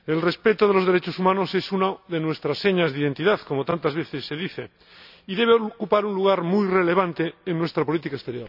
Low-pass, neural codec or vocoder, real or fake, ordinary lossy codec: 5.4 kHz; none; real; none